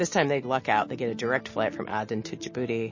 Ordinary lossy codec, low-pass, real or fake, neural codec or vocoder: MP3, 32 kbps; 7.2 kHz; real; none